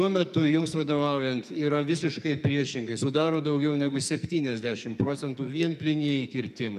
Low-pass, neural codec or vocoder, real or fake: 14.4 kHz; codec, 44.1 kHz, 2.6 kbps, SNAC; fake